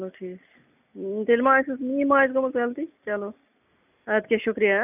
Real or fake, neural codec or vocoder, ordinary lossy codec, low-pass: real; none; none; 3.6 kHz